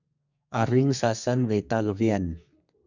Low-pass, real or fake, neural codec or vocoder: 7.2 kHz; fake; codec, 32 kHz, 1.9 kbps, SNAC